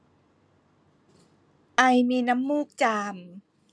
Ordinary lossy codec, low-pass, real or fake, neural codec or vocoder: none; none; real; none